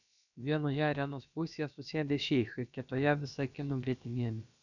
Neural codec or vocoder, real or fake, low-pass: codec, 16 kHz, about 1 kbps, DyCAST, with the encoder's durations; fake; 7.2 kHz